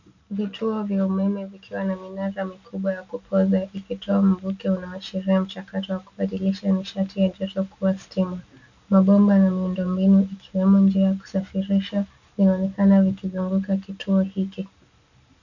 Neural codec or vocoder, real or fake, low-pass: none; real; 7.2 kHz